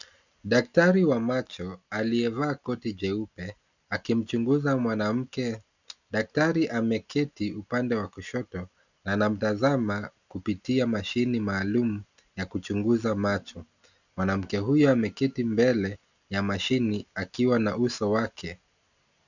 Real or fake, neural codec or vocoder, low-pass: real; none; 7.2 kHz